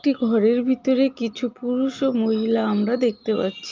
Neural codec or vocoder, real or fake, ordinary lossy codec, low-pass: none; real; Opus, 24 kbps; 7.2 kHz